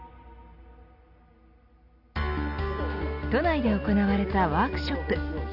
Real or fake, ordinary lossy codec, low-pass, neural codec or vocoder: real; none; 5.4 kHz; none